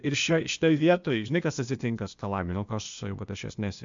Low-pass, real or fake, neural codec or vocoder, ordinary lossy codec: 7.2 kHz; fake; codec, 16 kHz, 0.8 kbps, ZipCodec; MP3, 64 kbps